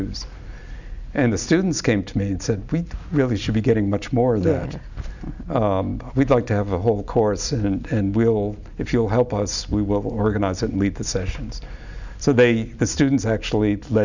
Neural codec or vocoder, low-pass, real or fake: none; 7.2 kHz; real